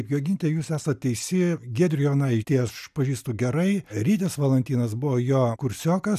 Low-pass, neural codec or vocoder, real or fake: 14.4 kHz; none; real